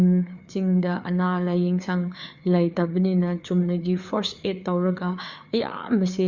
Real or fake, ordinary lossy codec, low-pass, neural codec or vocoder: fake; Opus, 64 kbps; 7.2 kHz; codec, 16 kHz, 4 kbps, FreqCodec, larger model